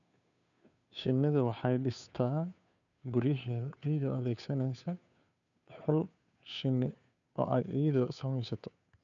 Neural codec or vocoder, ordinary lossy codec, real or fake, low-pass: codec, 16 kHz, 2 kbps, FunCodec, trained on Chinese and English, 25 frames a second; none; fake; 7.2 kHz